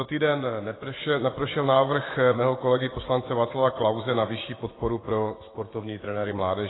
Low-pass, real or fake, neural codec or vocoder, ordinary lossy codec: 7.2 kHz; fake; vocoder, 24 kHz, 100 mel bands, Vocos; AAC, 16 kbps